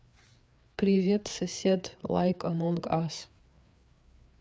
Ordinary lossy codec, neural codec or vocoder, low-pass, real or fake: none; codec, 16 kHz, 4 kbps, FreqCodec, larger model; none; fake